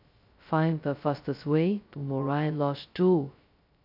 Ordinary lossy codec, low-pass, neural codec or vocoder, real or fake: none; 5.4 kHz; codec, 16 kHz, 0.2 kbps, FocalCodec; fake